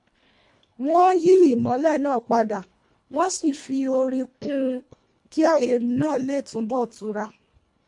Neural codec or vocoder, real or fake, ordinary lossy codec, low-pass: codec, 24 kHz, 1.5 kbps, HILCodec; fake; MP3, 64 kbps; 10.8 kHz